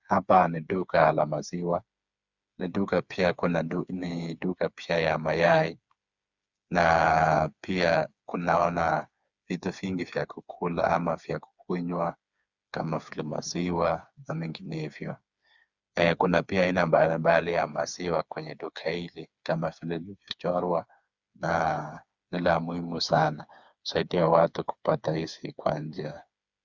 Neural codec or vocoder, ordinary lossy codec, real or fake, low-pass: codec, 16 kHz, 4 kbps, FreqCodec, smaller model; Opus, 64 kbps; fake; 7.2 kHz